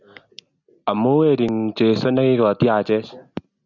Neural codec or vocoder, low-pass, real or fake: none; 7.2 kHz; real